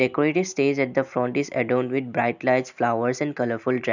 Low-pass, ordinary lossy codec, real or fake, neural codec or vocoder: 7.2 kHz; none; real; none